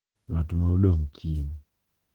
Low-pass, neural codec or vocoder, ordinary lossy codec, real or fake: 19.8 kHz; autoencoder, 48 kHz, 32 numbers a frame, DAC-VAE, trained on Japanese speech; Opus, 16 kbps; fake